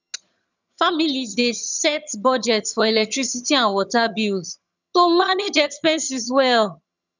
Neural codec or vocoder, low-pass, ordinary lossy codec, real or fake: vocoder, 22.05 kHz, 80 mel bands, HiFi-GAN; 7.2 kHz; none; fake